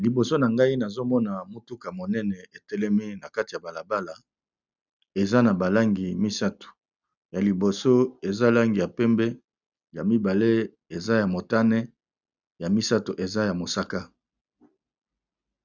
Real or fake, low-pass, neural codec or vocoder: real; 7.2 kHz; none